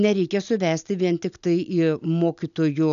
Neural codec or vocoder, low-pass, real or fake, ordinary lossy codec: none; 7.2 kHz; real; MP3, 96 kbps